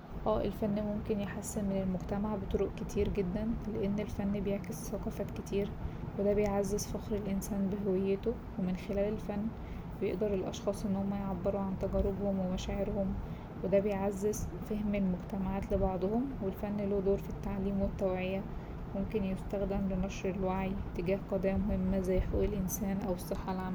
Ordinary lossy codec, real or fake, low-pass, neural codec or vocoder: none; real; none; none